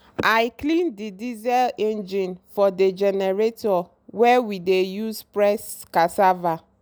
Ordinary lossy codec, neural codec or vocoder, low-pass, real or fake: none; none; none; real